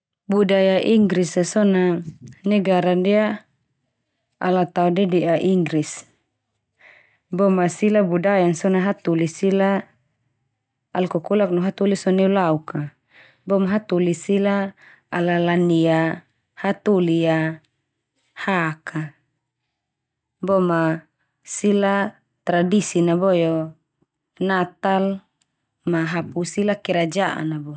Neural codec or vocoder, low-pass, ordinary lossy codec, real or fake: none; none; none; real